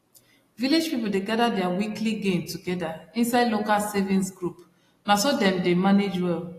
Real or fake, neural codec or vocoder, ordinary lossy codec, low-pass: real; none; AAC, 48 kbps; 14.4 kHz